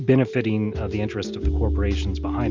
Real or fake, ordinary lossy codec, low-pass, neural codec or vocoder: real; Opus, 32 kbps; 7.2 kHz; none